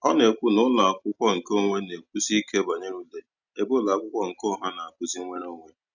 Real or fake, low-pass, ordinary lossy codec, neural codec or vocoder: real; 7.2 kHz; none; none